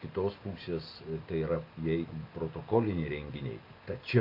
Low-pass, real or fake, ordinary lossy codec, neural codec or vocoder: 5.4 kHz; real; AAC, 48 kbps; none